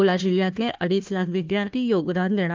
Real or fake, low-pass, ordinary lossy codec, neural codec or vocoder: fake; 7.2 kHz; Opus, 32 kbps; codec, 16 kHz, 1 kbps, FunCodec, trained on Chinese and English, 50 frames a second